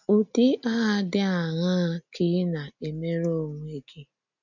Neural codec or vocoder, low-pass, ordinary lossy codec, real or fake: none; 7.2 kHz; none; real